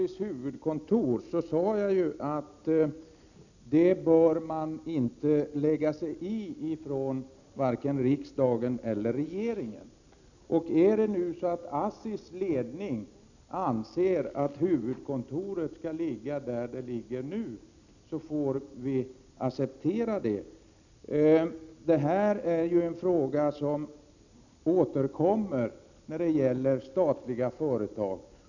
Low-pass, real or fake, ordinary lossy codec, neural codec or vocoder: 7.2 kHz; real; none; none